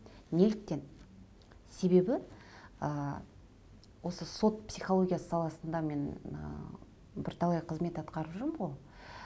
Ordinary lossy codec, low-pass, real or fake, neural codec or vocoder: none; none; real; none